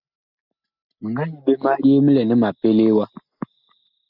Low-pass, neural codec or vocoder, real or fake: 5.4 kHz; none; real